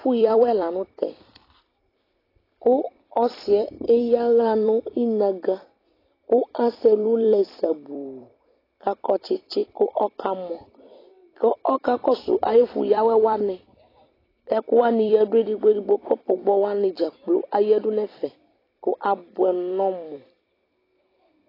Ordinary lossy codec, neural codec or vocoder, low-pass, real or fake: AAC, 24 kbps; vocoder, 44.1 kHz, 128 mel bands every 256 samples, BigVGAN v2; 5.4 kHz; fake